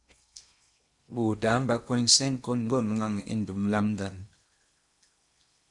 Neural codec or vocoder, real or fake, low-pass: codec, 16 kHz in and 24 kHz out, 0.8 kbps, FocalCodec, streaming, 65536 codes; fake; 10.8 kHz